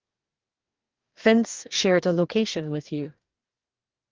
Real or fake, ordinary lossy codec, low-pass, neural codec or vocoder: fake; Opus, 32 kbps; 7.2 kHz; codec, 44.1 kHz, 2.6 kbps, DAC